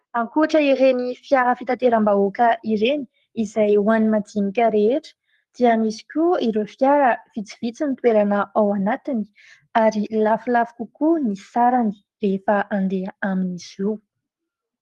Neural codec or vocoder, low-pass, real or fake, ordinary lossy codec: codec, 44.1 kHz, 7.8 kbps, Pupu-Codec; 14.4 kHz; fake; Opus, 24 kbps